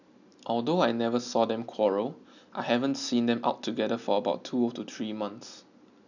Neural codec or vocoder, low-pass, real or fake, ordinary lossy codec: none; 7.2 kHz; real; none